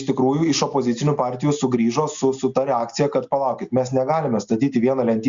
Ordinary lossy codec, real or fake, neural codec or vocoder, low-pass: Opus, 64 kbps; real; none; 7.2 kHz